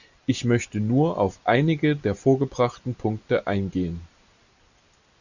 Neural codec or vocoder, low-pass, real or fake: none; 7.2 kHz; real